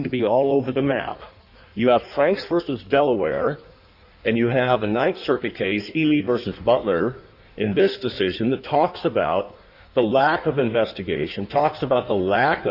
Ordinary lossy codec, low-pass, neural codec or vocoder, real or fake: Opus, 64 kbps; 5.4 kHz; codec, 16 kHz in and 24 kHz out, 1.1 kbps, FireRedTTS-2 codec; fake